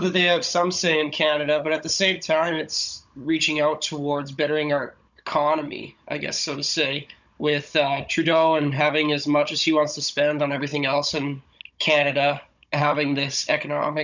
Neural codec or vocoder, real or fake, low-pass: codec, 16 kHz, 16 kbps, FunCodec, trained on Chinese and English, 50 frames a second; fake; 7.2 kHz